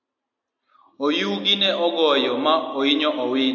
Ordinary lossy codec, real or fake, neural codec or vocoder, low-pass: AAC, 48 kbps; real; none; 7.2 kHz